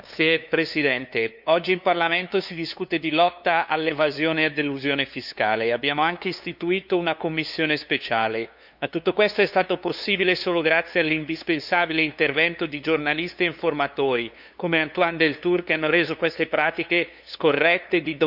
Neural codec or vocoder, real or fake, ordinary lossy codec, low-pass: codec, 16 kHz, 2 kbps, FunCodec, trained on LibriTTS, 25 frames a second; fake; none; 5.4 kHz